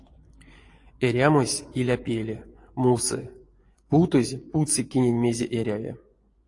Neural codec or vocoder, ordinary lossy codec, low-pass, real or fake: vocoder, 24 kHz, 100 mel bands, Vocos; AAC, 48 kbps; 10.8 kHz; fake